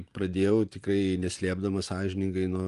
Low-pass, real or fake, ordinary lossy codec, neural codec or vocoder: 10.8 kHz; fake; Opus, 32 kbps; vocoder, 24 kHz, 100 mel bands, Vocos